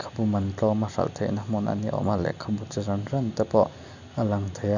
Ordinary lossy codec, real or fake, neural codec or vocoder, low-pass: none; real; none; 7.2 kHz